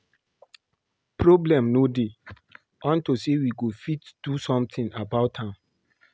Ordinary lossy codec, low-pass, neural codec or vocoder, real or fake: none; none; none; real